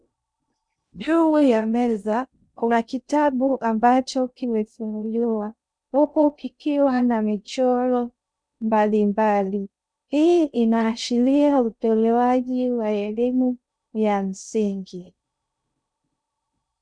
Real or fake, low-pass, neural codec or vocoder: fake; 9.9 kHz; codec, 16 kHz in and 24 kHz out, 0.6 kbps, FocalCodec, streaming, 2048 codes